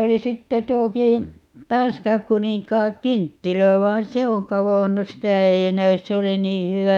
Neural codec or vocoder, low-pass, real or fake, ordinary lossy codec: autoencoder, 48 kHz, 32 numbers a frame, DAC-VAE, trained on Japanese speech; 19.8 kHz; fake; none